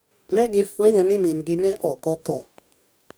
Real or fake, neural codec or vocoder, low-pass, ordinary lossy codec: fake; codec, 44.1 kHz, 2.6 kbps, DAC; none; none